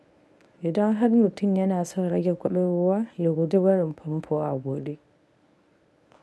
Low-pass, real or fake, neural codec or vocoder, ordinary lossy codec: none; fake; codec, 24 kHz, 0.9 kbps, WavTokenizer, medium speech release version 1; none